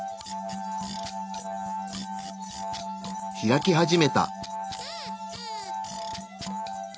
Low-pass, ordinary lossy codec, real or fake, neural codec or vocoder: none; none; real; none